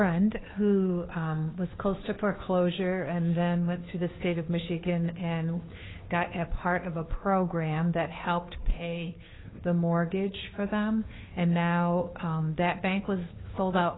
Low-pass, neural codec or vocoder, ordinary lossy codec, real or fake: 7.2 kHz; codec, 16 kHz, 2 kbps, FunCodec, trained on Chinese and English, 25 frames a second; AAC, 16 kbps; fake